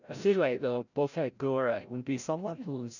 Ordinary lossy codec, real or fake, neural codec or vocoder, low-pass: none; fake; codec, 16 kHz, 0.5 kbps, FreqCodec, larger model; 7.2 kHz